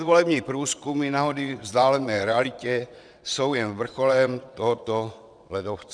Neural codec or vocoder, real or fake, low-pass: vocoder, 22.05 kHz, 80 mel bands, WaveNeXt; fake; 9.9 kHz